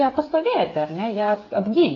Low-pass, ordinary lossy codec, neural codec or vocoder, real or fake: 7.2 kHz; AAC, 32 kbps; codec, 16 kHz, 8 kbps, FreqCodec, smaller model; fake